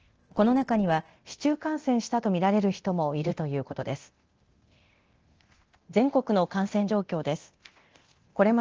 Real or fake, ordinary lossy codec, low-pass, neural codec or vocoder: fake; Opus, 16 kbps; 7.2 kHz; codec, 24 kHz, 0.9 kbps, DualCodec